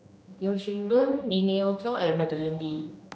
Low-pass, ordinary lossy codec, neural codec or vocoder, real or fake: none; none; codec, 16 kHz, 1 kbps, X-Codec, HuBERT features, trained on general audio; fake